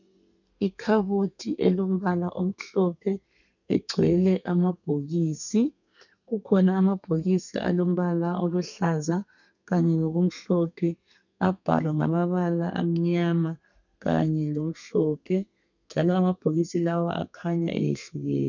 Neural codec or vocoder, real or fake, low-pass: codec, 32 kHz, 1.9 kbps, SNAC; fake; 7.2 kHz